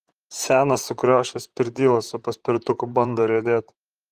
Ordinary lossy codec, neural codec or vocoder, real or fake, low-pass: Opus, 24 kbps; vocoder, 44.1 kHz, 128 mel bands, Pupu-Vocoder; fake; 14.4 kHz